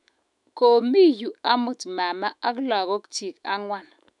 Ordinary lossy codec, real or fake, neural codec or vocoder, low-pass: none; fake; autoencoder, 48 kHz, 128 numbers a frame, DAC-VAE, trained on Japanese speech; 10.8 kHz